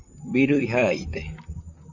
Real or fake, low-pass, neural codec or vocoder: fake; 7.2 kHz; vocoder, 44.1 kHz, 128 mel bands, Pupu-Vocoder